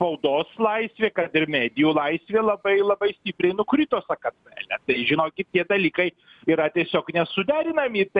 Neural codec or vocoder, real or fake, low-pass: none; real; 10.8 kHz